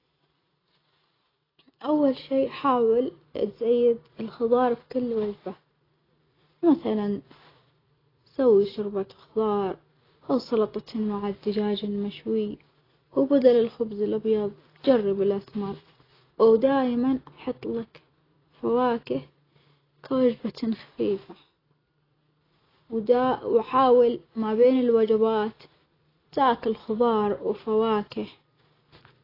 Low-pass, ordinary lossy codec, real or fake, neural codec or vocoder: 5.4 kHz; AAC, 24 kbps; real; none